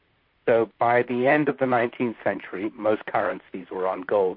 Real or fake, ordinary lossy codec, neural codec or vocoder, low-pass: fake; MP3, 32 kbps; vocoder, 44.1 kHz, 128 mel bands, Pupu-Vocoder; 5.4 kHz